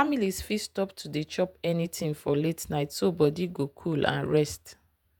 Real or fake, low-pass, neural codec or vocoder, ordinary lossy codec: fake; none; vocoder, 48 kHz, 128 mel bands, Vocos; none